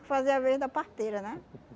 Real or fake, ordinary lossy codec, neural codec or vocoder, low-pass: real; none; none; none